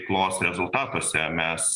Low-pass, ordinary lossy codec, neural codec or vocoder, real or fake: 10.8 kHz; Opus, 64 kbps; none; real